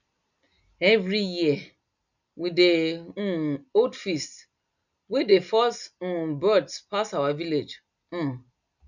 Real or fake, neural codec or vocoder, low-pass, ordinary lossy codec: real; none; 7.2 kHz; none